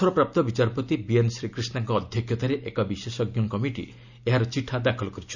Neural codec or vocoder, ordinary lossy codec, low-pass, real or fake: none; none; 7.2 kHz; real